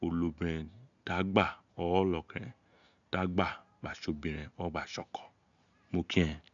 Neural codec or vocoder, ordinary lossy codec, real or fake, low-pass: none; none; real; 7.2 kHz